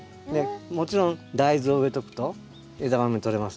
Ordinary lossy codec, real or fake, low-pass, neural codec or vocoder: none; real; none; none